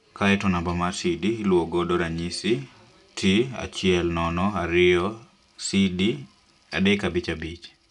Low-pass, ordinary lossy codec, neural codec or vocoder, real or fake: 10.8 kHz; none; none; real